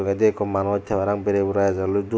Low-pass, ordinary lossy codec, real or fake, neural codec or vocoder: none; none; real; none